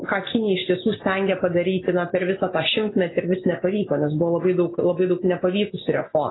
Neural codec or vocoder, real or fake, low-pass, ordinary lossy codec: none; real; 7.2 kHz; AAC, 16 kbps